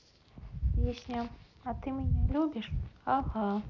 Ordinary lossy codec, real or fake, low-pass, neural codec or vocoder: none; real; 7.2 kHz; none